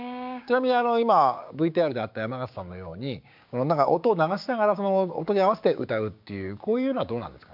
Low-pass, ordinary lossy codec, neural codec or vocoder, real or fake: 5.4 kHz; MP3, 48 kbps; codec, 16 kHz, 6 kbps, DAC; fake